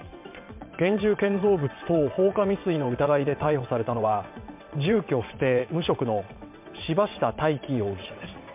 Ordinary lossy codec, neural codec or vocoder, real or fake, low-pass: MP3, 32 kbps; vocoder, 22.05 kHz, 80 mel bands, WaveNeXt; fake; 3.6 kHz